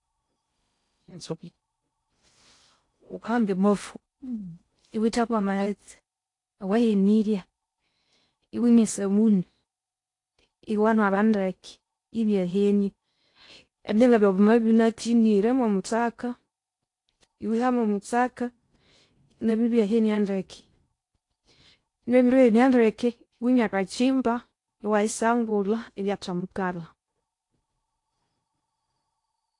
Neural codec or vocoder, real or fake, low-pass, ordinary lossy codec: codec, 16 kHz in and 24 kHz out, 0.6 kbps, FocalCodec, streaming, 4096 codes; fake; 10.8 kHz; AAC, 48 kbps